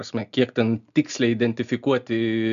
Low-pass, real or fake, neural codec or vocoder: 7.2 kHz; real; none